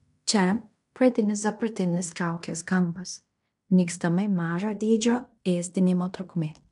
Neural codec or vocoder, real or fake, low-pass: codec, 16 kHz in and 24 kHz out, 0.9 kbps, LongCat-Audio-Codec, fine tuned four codebook decoder; fake; 10.8 kHz